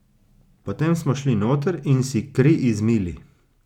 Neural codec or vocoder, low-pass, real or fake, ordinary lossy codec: none; 19.8 kHz; real; none